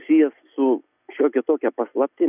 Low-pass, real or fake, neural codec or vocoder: 3.6 kHz; real; none